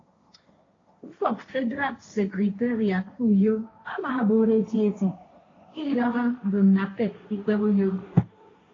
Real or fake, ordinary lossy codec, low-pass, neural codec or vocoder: fake; AAC, 32 kbps; 7.2 kHz; codec, 16 kHz, 1.1 kbps, Voila-Tokenizer